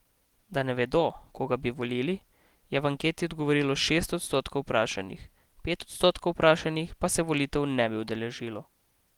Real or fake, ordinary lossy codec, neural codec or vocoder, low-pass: real; Opus, 24 kbps; none; 19.8 kHz